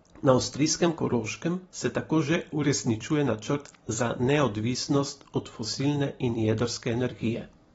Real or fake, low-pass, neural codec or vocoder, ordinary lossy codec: fake; 19.8 kHz; vocoder, 44.1 kHz, 128 mel bands every 256 samples, BigVGAN v2; AAC, 24 kbps